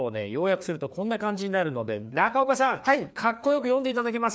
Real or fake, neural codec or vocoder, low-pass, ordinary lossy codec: fake; codec, 16 kHz, 2 kbps, FreqCodec, larger model; none; none